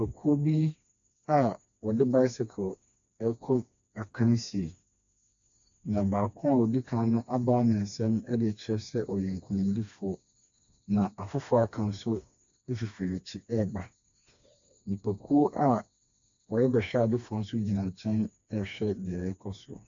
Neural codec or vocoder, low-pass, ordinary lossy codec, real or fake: codec, 16 kHz, 2 kbps, FreqCodec, smaller model; 7.2 kHz; AAC, 64 kbps; fake